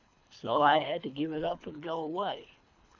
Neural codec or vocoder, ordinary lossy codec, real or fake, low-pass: codec, 24 kHz, 3 kbps, HILCodec; MP3, 64 kbps; fake; 7.2 kHz